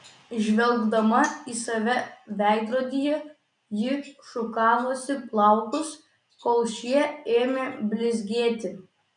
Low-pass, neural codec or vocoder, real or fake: 9.9 kHz; none; real